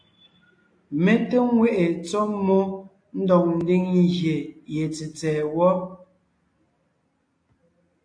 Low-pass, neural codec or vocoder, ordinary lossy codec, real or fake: 9.9 kHz; none; AAC, 48 kbps; real